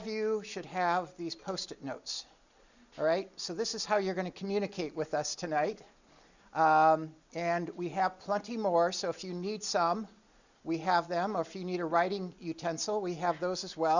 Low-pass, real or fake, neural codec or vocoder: 7.2 kHz; real; none